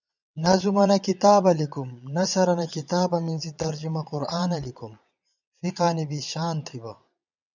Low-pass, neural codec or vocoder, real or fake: 7.2 kHz; vocoder, 22.05 kHz, 80 mel bands, Vocos; fake